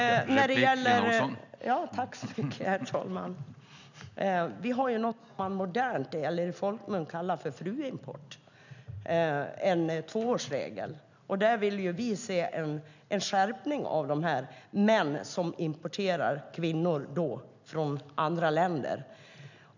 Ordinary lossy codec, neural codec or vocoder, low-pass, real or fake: none; none; 7.2 kHz; real